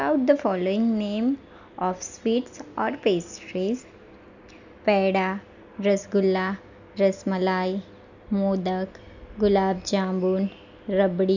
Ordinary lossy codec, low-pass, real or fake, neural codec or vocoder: none; 7.2 kHz; real; none